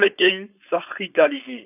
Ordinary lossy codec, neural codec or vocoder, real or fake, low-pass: none; codec, 16 kHz, 4.8 kbps, FACodec; fake; 3.6 kHz